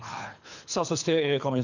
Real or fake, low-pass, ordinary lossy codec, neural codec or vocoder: fake; 7.2 kHz; none; codec, 24 kHz, 3 kbps, HILCodec